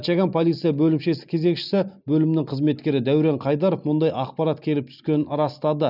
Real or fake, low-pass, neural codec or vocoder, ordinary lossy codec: real; 5.4 kHz; none; none